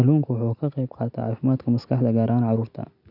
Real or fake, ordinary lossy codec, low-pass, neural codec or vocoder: real; none; 5.4 kHz; none